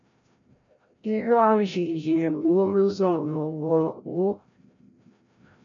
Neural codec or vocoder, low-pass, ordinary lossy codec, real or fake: codec, 16 kHz, 0.5 kbps, FreqCodec, larger model; 7.2 kHz; AAC, 64 kbps; fake